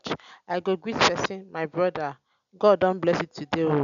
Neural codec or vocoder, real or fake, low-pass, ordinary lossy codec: none; real; 7.2 kHz; AAC, 96 kbps